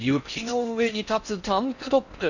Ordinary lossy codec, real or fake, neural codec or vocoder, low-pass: none; fake; codec, 16 kHz in and 24 kHz out, 0.6 kbps, FocalCodec, streaming, 4096 codes; 7.2 kHz